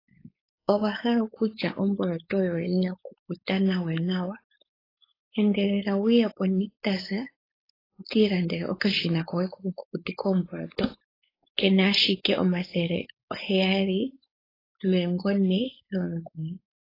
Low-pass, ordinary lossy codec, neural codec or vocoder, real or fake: 5.4 kHz; AAC, 24 kbps; codec, 16 kHz, 4.8 kbps, FACodec; fake